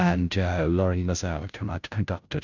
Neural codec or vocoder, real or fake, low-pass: codec, 16 kHz, 0.5 kbps, FunCodec, trained on Chinese and English, 25 frames a second; fake; 7.2 kHz